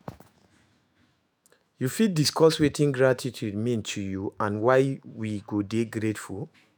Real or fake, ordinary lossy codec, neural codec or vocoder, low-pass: fake; none; autoencoder, 48 kHz, 128 numbers a frame, DAC-VAE, trained on Japanese speech; none